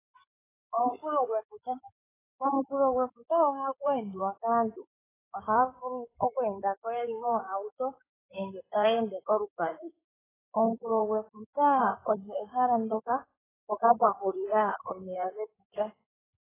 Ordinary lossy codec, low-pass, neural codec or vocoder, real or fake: AAC, 16 kbps; 3.6 kHz; codec, 16 kHz in and 24 kHz out, 2.2 kbps, FireRedTTS-2 codec; fake